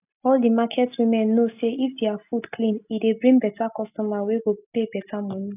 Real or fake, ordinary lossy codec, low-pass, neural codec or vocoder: real; none; 3.6 kHz; none